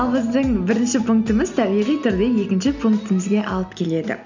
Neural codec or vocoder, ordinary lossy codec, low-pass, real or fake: none; none; 7.2 kHz; real